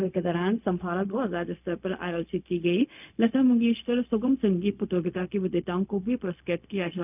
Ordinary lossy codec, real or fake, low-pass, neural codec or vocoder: none; fake; 3.6 kHz; codec, 16 kHz, 0.4 kbps, LongCat-Audio-Codec